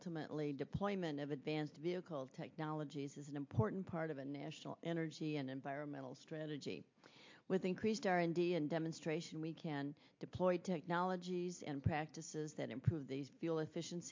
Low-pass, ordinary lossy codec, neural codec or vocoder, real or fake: 7.2 kHz; MP3, 48 kbps; none; real